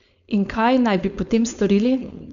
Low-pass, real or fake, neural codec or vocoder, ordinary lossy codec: 7.2 kHz; fake; codec, 16 kHz, 4.8 kbps, FACodec; none